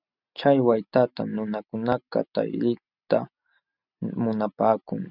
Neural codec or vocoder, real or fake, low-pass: none; real; 5.4 kHz